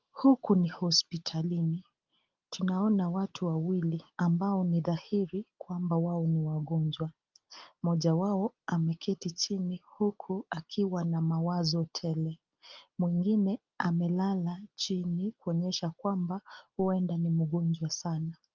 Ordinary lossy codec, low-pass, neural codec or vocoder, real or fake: Opus, 32 kbps; 7.2 kHz; none; real